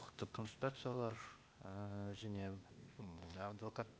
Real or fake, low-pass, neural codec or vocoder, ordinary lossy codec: fake; none; codec, 16 kHz, 0.8 kbps, ZipCodec; none